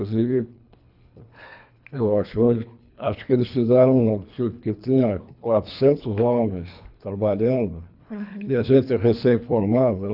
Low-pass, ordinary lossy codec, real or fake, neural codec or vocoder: 5.4 kHz; AAC, 48 kbps; fake; codec, 24 kHz, 3 kbps, HILCodec